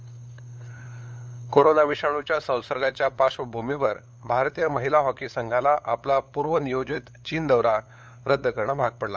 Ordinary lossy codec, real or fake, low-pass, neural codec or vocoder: none; fake; none; codec, 16 kHz, 8 kbps, FunCodec, trained on LibriTTS, 25 frames a second